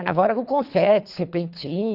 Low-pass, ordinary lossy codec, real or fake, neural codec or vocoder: 5.4 kHz; none; fake; codec, 24 kHz, 3 kbps, HILCodec